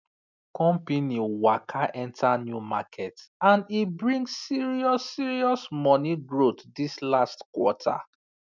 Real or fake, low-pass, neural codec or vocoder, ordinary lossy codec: real; 7.2 kHz; none; none